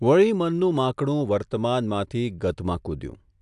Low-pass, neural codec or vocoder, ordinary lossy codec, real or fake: 10.8 kHz; none; AAC, 96 kbps; real